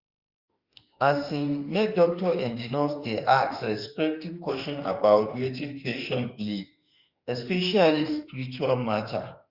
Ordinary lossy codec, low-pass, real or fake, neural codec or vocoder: Opus, 64 kbps; 5.4 kHz; fake; autoencoder, 48 kHz, 32 numbers a frame, DAC-VAE, trained on Japanese speech